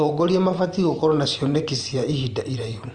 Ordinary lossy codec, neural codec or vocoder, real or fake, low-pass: Opus, 32 kbps; vocoder, 44.1 kHz, 128 mel bands every 512 samples, BigVGAN v2; fake; 9.9 kHz